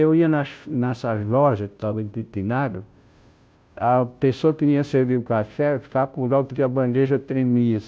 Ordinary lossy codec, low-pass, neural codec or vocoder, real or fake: none; none; codec, 16 kHz, 0.5 kbps, FunCodec, trained on Chinese and English, 25 frames a second; fake